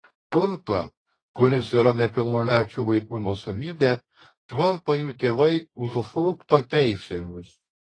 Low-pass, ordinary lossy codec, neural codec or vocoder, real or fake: 9.9 kHz; AAC, 32 kbps; codec, 24 kHz, 0.9 kbps, WavTokenizer, medium music audio release; fake